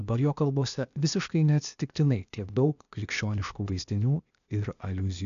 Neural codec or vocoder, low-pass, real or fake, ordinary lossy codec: codec, 16 kHz, 0.8 kbps, ZipCodec; 7.2 kHz; fake; MP3, 96 kbps